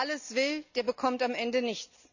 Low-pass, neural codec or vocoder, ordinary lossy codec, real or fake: 7.2 kHz; none; none; real